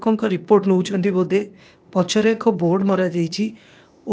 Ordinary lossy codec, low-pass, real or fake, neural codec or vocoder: none; none; fake; codec, 16 kHz, 0.8 kbps, ZipCodec